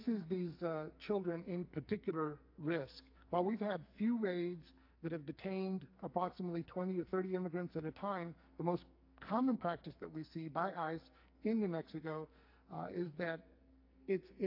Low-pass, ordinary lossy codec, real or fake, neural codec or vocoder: 5.4 kHz; AAC, 32 kbps; fake; codec, 44.1 kHz, 2.6 kbps, SNAC